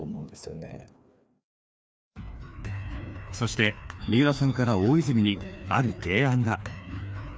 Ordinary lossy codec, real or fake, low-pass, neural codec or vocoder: none; fake; none; codec, 16 kHz, 2 kbps, FreqCodec, larger model